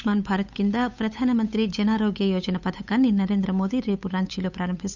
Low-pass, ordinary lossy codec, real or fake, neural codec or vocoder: 7.2 kHz; none; fake; codec, 16 kHz, 8 kbps, FunCodec, trained on Chinese and English, 25 frames a second